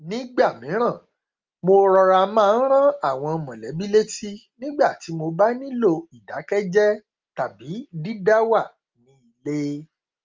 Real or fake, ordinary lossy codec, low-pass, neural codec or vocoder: real; Opus, 32 kbps; 7.2 kHz; none